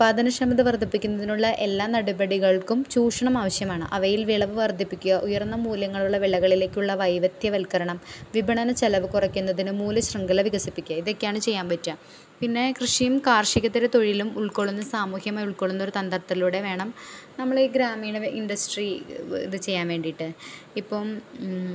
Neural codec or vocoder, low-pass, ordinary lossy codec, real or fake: none; none; none; real